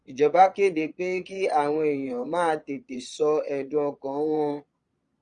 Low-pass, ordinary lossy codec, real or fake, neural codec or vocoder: 9.9 kHz; Opus, 64 kbps; fake; vocoder, 22.05 kHz, 80 mel bands, Vocos